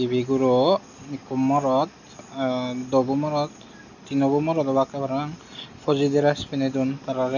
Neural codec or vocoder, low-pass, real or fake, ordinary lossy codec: none; 7.2 kHz; real; none